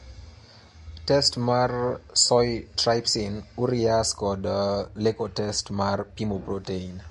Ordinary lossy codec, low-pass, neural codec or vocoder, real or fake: MP3, 48 kbps; 14.4 kHz; none; real